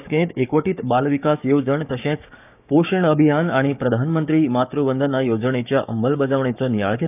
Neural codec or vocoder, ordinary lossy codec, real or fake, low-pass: codec, 16 kHz, 6 kbps, DAC; none; fake; 3.6 kHz